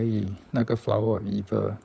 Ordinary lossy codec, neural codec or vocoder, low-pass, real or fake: none; codec, 16 kHz, 16 kbps, FunCodec, trained on LibriTTS, 50 frames a second; none; fake